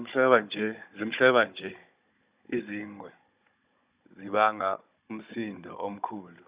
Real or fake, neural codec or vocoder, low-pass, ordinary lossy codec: fake; codec, 16 kHz, 16 kbps, FunCodec, trained on Chinese and English, 50 frames a second; 3.6 kHz; Opus, 64 kbps